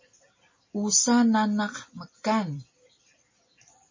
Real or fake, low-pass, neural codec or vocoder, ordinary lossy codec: real; 7.2 kHz; none; MP3, 32 kbps